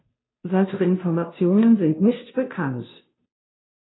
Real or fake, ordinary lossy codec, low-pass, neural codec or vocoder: fake; AAC, 16 kbps; 7.2 kHz; codec, 16 kHz, 0.5 kbps, FunCodec, trained on Chinese and English, 25 frames a second